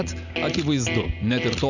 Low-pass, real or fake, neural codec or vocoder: 7.2 kHz; real; none